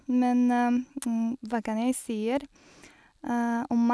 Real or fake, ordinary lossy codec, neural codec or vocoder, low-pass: real; none; none; none